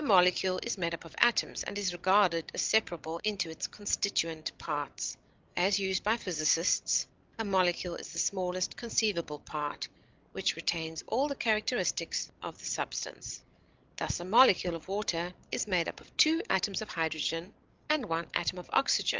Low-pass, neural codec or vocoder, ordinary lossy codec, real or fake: 7.2 kHz; codec, 16 kHz, 16 kbps, FreqCodec, larger model; Opus, 32 kbps; fake